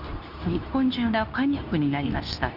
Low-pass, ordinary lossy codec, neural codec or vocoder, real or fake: 5.4 kHz; none; codec, 24 kHz, 0.9 kbps, WavTokenizer, medium speech release version 2; fake